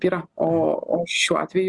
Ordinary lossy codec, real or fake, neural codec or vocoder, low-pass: Opus, 64 kbps; real; none; 10.8 kHz